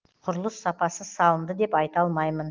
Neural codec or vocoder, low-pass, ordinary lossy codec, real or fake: none; 7.2 kHz; Opus, 24 kbps; real